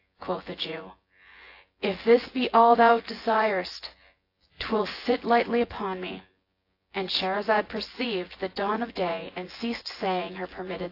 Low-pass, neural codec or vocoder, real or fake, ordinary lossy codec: 5.4 kHz; vocoder, 24 kHz, 100 mel bands, Vocos; fake; AAC, 32 kbps